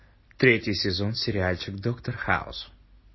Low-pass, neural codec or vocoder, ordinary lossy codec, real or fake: 7.2 kHz; none; MP3, 24 kbps; real